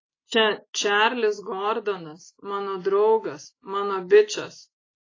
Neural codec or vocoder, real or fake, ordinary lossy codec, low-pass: none; real; AAC, 32 kbps; 7.2 kHz